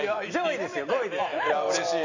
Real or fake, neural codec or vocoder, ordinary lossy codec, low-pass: real; none; none; 7.2 kHz